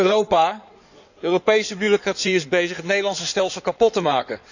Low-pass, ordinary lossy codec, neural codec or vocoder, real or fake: 7.2 kHz; none; codec, 16 kHz in and 24 kHz out, 2.2 kbps, FireRedTTS-2 codec; fake